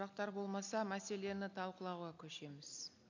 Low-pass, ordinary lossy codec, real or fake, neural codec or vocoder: 7.2 kHz; none; real; none